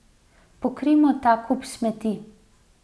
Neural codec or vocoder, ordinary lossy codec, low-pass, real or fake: none; none; none; real